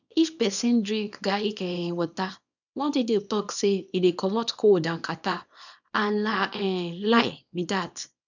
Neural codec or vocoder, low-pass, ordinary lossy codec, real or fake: codec, 24 kHz, 0.9 kbps, WavTokenizer, small release; 7.2 kHz; none; fake